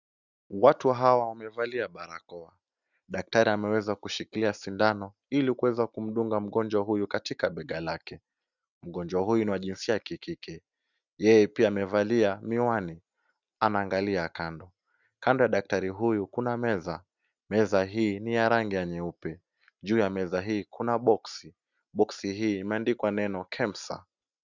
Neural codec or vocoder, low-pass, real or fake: none; 7.2 kHz; real